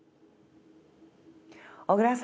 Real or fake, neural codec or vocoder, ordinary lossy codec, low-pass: real; none; none; none